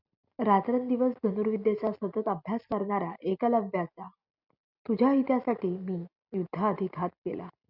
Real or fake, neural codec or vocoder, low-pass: real; none; 5.4 kHz